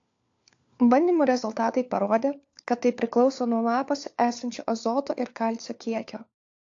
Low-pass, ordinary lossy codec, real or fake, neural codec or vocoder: 7.2 kHz; AAC, 48 kbps; fake; codec, 16 kHz, 4 kbps, FunCodec, trained on LibriTTS, 50 frames a second